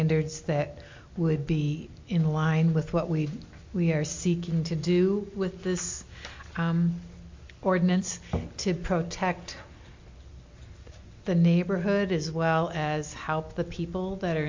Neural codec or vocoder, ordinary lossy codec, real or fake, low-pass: none; MP3, 48 kbps; real; 7.2 kHz